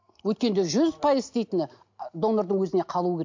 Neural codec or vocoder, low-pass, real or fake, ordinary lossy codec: none; 7.2 kHz; real; MP3, 48 kbps